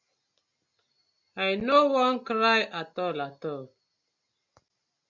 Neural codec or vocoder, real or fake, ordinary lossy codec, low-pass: none; real; MP3, 64 kbps; 7.2 kHz